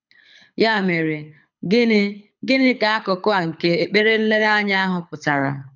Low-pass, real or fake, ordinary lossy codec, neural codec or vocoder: 7.2 kHz; fake; none; codec, 24 kHz, 6 kbps, HILCodec